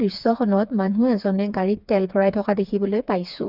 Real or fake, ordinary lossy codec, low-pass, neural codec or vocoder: fake; Opus, 64 kbps; 5.4 kHz; codec, 16 kHz, 4 kbps, FreqCodec, smaller model